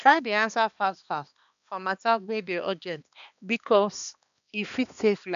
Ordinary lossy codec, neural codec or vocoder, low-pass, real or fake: none; codec, 16 kHz, 2 kbps, X-Codec, HuBERT features, trained on balanced general audio; 7.2 kHz; fake